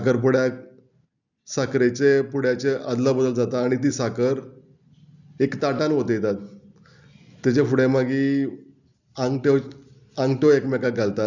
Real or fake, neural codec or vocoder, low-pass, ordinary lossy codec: real; none; 7.2 kHz; none